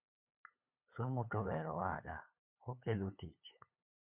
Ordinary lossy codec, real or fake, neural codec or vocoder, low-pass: MP3, 32 kbps; fake; codec, 16 kHz, 8 kbps, FunCodec, trained on LibriTTS, 25 frames a second; 3.6 kHz